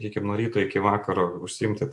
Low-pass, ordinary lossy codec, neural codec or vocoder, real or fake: 10.8 kHz; AAC, 64 kbps; none; real